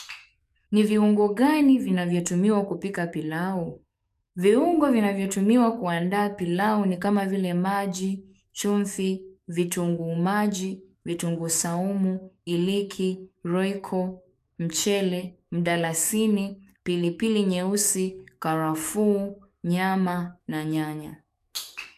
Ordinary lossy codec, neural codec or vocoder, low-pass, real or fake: MP3, 96 kbps; codec, 44.1 kHz, 7.8 kbps, DAC; 14.4 kHz; fake